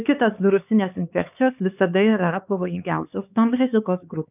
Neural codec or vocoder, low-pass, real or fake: codec, 16 kHz, 4 kbps, X-Codec, HuBERT features, trained on LibriSpeech; 3.6 kHz; fake